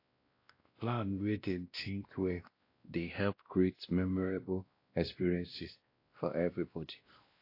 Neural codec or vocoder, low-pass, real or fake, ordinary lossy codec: codec, 16 kHz, 1 kbps, X-Codec, WavLM features, trained on Multilingual LibriSpeech; 5.4 kHz; fake; AAC, 32 kbps